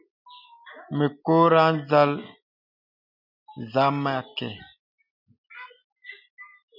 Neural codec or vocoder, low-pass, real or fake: none; 5.4 kHz; real